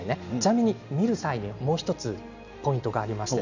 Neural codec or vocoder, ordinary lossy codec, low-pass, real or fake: none; none; 7.2 kHz; real